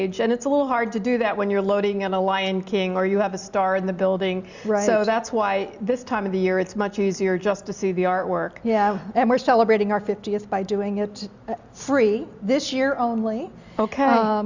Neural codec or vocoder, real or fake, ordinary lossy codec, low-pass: none; real; Opus, 64 kbps; 7.2 kHz